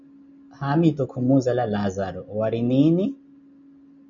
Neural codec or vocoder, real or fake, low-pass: none; real; 7.2 kHz